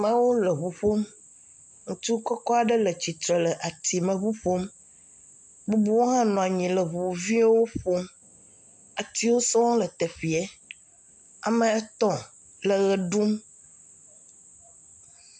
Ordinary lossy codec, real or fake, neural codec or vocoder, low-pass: MP3, 64 kbps; real; none; 9.9 kHz